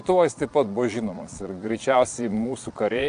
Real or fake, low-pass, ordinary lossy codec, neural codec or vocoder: fake; 9.9 kHz; AAC, 64 kbps; vocoder, 22.05 kHz, 80 mel bands, Vocos